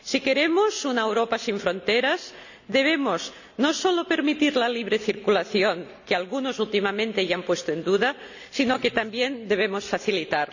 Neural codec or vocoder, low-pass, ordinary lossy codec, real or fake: none; 7.2 kHz; none; real